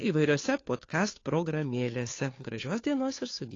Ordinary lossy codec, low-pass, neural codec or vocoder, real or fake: AAC, 32 kbps; 7.2 kHz; codec, 16 kHz, 2 kbps, FunCodec, trained on Chinese and English, 25 frames a second; fake